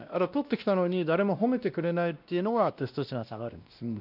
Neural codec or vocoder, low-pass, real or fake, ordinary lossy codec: codec, 16 kHz, 1 kbps, X-Codec, WavLM features, trained on Multilingual LibriSpeech; 5.4 kHz; fake; none